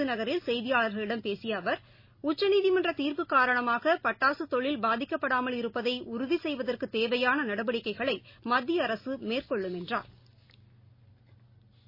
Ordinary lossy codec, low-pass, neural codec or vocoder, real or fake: MP3, 24 kbps; 5.4 kHz; none; real